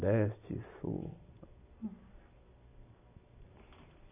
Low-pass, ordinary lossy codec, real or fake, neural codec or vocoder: 3.6 kHz; none; real; none